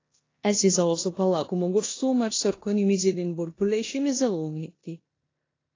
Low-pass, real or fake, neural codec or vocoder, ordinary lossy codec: 7.2 kHz; fake; codec, 16 kHz in and 24 kHz out, 0.9 kbps, LongCat-Audio-Codec, four codebook decoder; AAC, 32 kbps